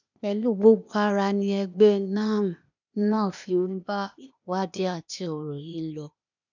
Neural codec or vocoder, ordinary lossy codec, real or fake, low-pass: codec, 16 kHz, 0.8 kbps, ZipCodec; none; fake; 7.2 kHz